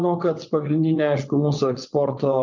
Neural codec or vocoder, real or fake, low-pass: vocoder, 22.05 kHz, 80 mel bands, WaveNeXt; fake; 7.2 kHz